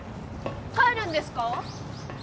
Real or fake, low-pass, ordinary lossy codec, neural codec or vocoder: real; none; none; none